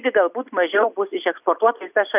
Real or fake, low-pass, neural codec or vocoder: real; 3.6 kHz; none